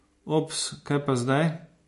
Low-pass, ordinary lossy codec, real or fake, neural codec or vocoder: 14.4 kHz; MP3, 48 kbps; real; none